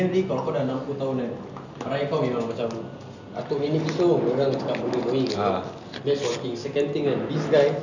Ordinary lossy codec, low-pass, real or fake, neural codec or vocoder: none; 7.2 kHz; real; none